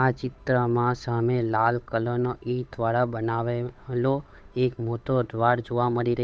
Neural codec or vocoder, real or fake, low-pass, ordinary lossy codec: codec, 16 kHz, 8 kbps, FunCodec, trained on Chinese and English, 25 frames a second; fake; none; none